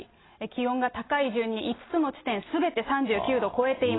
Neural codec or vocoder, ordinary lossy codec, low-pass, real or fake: none; AAC, 16 kbps; 7.2 kHz; real